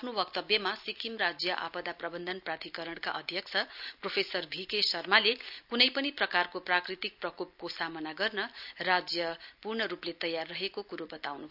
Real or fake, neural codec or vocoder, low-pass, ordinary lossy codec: real; none; 5.4 kHz; none